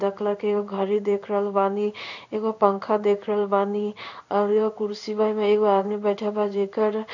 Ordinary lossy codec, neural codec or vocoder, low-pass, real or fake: none; codec, 16 kHz in and 24 kHz out, 1 kbps, XY-Tokenizer; 7.2 kHz; fake